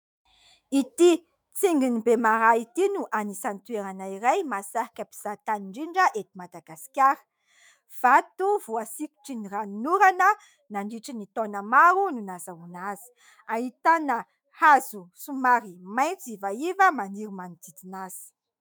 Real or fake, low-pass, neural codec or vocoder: fake; 19.8 kHz; autoencoder, 48 kHz, 128 numbers a frame, DAC-VAE, trained on Japanese speech